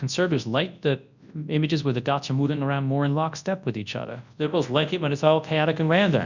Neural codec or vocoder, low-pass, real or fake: codec, 24 kHz, 0.9 kbps, WavTokenizer, large speech release; 7.2 kHz; fake